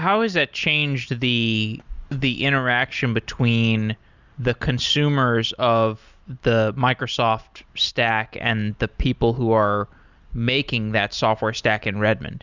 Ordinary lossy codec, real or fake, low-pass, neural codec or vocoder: Opus, 64 kbps; real; 7.2 kHz; none